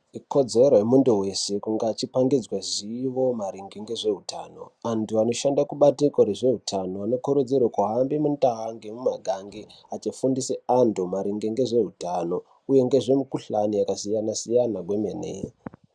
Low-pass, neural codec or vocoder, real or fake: 9.9 kHz; none; real